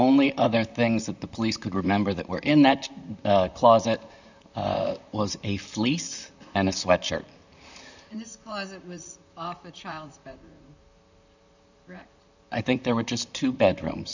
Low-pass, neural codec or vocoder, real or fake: 7.2 kHz; vocoder, 44.1 kHz, 128 mel bands, Pupu-Vocoder; fake